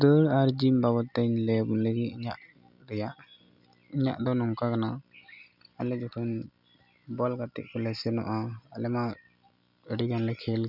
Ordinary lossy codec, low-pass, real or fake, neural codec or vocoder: none; 5.4 kHz; real; none